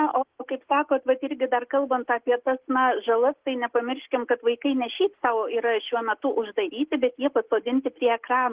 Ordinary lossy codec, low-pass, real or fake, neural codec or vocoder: Opus, 16 kbps; 3.6 kHz; real; none